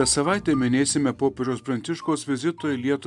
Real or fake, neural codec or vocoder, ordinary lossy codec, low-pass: real; none; MP3, 96 kbps; 10.8 kHz